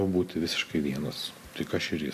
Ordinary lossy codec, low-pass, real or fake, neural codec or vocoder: AAC, 96 kbps; 14.4 kHz; real; none